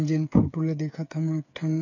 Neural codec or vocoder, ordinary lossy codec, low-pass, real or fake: codec, 16 kHz, 8 kbps, FreqCodec, smaller model; none; 7.2 kHz; fake